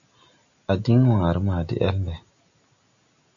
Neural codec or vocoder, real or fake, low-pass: none; real; 7.2 kHz